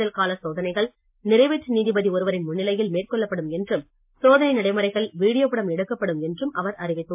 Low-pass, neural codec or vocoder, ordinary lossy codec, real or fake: 3.6 kHz; none; MP3, 32 kbps; real